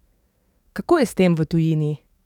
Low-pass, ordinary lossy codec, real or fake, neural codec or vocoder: 19.8 kHz; none; fake; codec, 44.1 kHz, 7.8 kbps, DAC